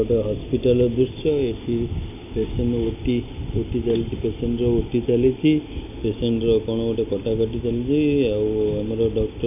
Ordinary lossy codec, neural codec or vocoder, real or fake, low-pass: none; none; real; 3.6 kHz